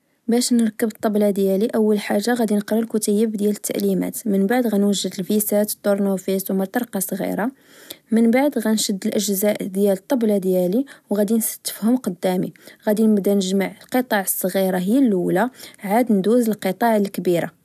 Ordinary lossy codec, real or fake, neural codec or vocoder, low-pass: none; real; none; 14.4 kHz